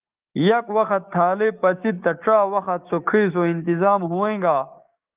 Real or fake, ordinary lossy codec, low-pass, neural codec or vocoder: fake; Opus, 24 kbps; 3.6 kHz; codec, 16 kHz, 6 kbps, DAC